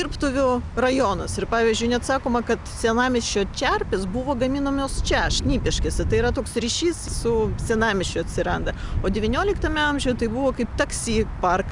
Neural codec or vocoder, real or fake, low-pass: none; real; 10.8 kHz